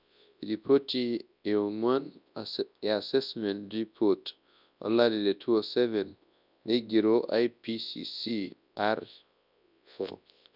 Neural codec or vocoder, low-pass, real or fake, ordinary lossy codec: codec, 24 kHz, 0.9 kbps, WavTokenizer, large speech release; 5.4 kHz; fake; none